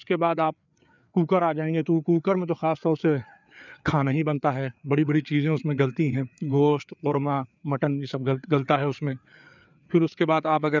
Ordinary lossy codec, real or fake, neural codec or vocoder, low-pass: none; fake; codec, 16 kHz, 4 kbps, FreqCodec, larger model; 7.2 kHz